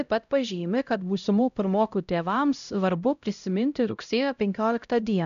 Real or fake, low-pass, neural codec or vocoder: fake; 7.2 kHz; codec, 16 kHz, 0.5 kbps, X-Codec, HuBERT features, trained on LibriSpeech